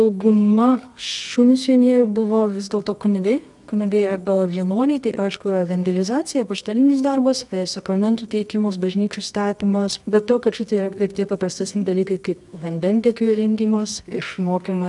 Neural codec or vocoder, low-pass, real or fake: codec, 24 kHz, 0.9 kbps, WavTokenizer, medium music audio release; 10.8 kHz; fake